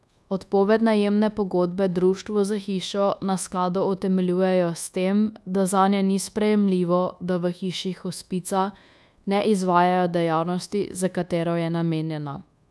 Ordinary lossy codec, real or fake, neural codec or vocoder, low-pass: none; fake; codec, 24 kHz, 1.2 kbps, DualCodec; none